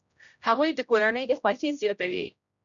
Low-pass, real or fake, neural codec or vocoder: 7.2 kHz; fake; codec, 16 kHz, 0.5 kbps, X-Codec, HuBERT features, trained on general audio